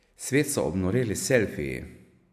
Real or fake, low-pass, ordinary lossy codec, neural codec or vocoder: real; 14.4 kHz; AAC, 96 kbps; none